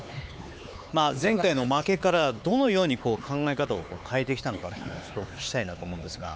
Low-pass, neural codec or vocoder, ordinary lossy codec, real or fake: none; codec, 16 kHz, 4 kbps, X-Codec, HuBERT features, trained on LibriSpeech; none; fake